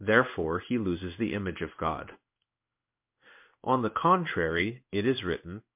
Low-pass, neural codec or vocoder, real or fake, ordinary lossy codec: 3.6 kHz; codec, 16 kHz in and 24 kHz out, 1 kbps, XY-Tokenizer; fake; MP3, 32 kbps